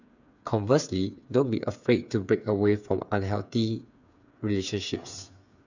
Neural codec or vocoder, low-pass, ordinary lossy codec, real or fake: codec, 16 kHz, 8 kbps, FreqCodec, smaller model; 7.2 kHz; none; fake